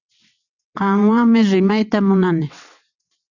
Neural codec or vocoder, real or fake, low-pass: vocoder, 22.05 kHz, 80 mel bands, WaveNeXt; fake; 7.2 kHz